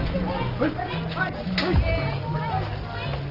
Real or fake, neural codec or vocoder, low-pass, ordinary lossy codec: real; none; 5.4 kHz; Opus, 24 kbps